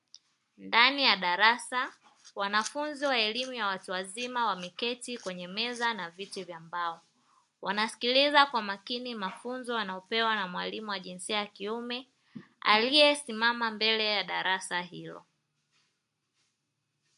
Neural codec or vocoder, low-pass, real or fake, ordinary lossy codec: none; 10.8 kHz; real; MP3, 64 kbps